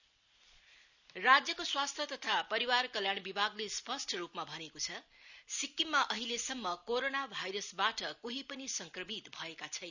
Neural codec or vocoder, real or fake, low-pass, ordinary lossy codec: none; real; 7.2 kHz; none